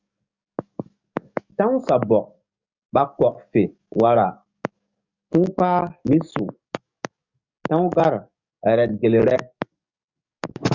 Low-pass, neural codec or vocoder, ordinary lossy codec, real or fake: 7.2 kHz; codec, 44.1 kHz, 7.8 kbps, DAC; Opus, 64 kbps; fake